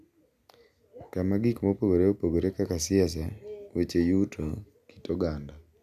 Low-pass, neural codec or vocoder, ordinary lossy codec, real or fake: 14.4 kHz; none; none; real